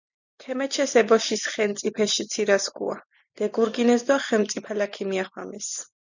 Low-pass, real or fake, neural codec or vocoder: 7.2 kHz; real; none